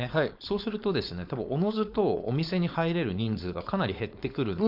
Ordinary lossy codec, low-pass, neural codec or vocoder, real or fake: none; 5.4 kHz; codec, 16 kHz, 4.8 kbps, FACodec; fake